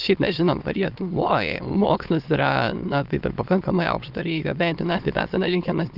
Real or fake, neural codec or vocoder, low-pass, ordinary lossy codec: fake; autoencoder, 22.05 kHz, a latent of 192 numbers a frame, VITS, trained on many speakers; 5.4 kHz; Opus, 32 kbps